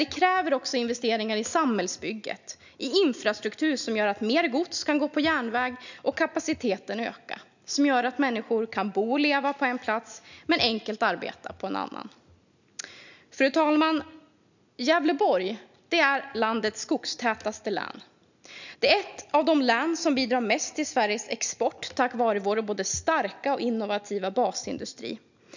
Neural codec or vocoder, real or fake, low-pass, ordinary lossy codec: none; real; 7.2 kHz; none